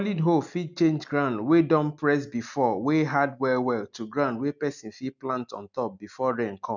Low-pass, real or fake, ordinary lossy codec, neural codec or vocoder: 7.2 kHz; real; none; none